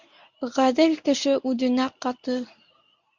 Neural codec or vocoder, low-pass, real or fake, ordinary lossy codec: codec, 24 kHz, 0.9 kbps, WavTokenizer, medium speech release version 1; 7.2 kHz; fake; MP3, 64 kbps